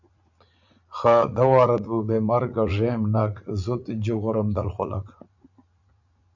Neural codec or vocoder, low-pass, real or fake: vocoder, 44.1 kHz, 80 mel bands, Vocos; 7.2 kHz; fake